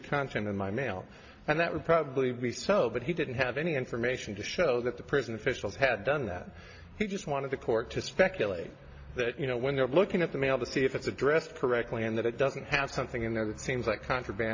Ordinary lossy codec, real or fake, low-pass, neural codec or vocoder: AAC, 48 kbps; real; 7.2 kHz; none